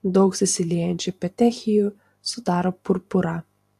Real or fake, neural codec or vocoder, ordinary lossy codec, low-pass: fake; vocoder, 44.1 kHz, 128 mel bands every 512 samples, BigVGAN v2; AAC, 64 kbps; 14.4 kHz